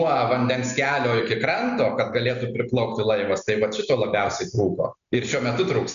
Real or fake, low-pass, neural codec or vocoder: real; 7.2 kHz; none